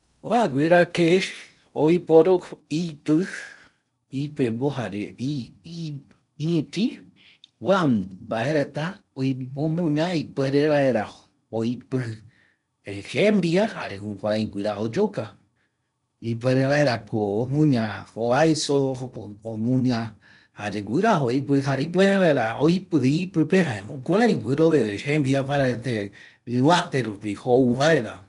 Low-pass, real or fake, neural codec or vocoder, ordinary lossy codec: 10.8 kHz; fake; codec, 16 kHz in and 24 kHz out, 0.8 kbps, FocalCodec, streaming, 65536 codes; none